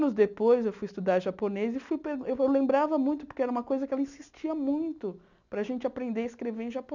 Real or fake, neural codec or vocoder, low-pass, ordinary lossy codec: real; none; 7.2 kHz; none